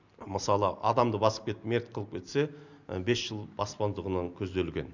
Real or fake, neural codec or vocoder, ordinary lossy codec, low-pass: real; none; none; 7.2 kHz